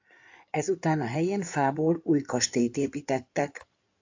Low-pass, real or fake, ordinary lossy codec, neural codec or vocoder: 7.2 kHz; fake; AAC, 48 kbps; codec, 16 kHz in and 24 kHz out, 2.2 kbps, FireRedTTS-2 codec